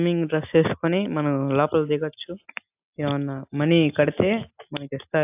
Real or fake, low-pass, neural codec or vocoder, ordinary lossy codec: real; 3.6 kHz; none; AAC, 32 kbps